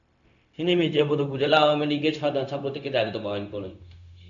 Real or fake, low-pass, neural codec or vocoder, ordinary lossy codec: fake; 7.2 kHz; codec, 16 kHz, 0.4 kbps, LongCat-Audio-Codec; MP3, 96 kbps